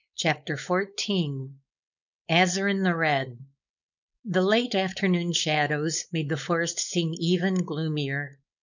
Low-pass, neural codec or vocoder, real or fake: 7.2 kHz; codec, 16 kHz, 4.8 kbps, FACodec; fake